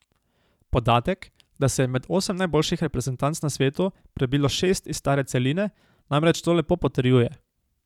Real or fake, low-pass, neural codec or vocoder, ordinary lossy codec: fake; 19.8 kHz; vocoder, 44.1 kHz, 128 mel bands every 512 samples, BigVGAN v2; none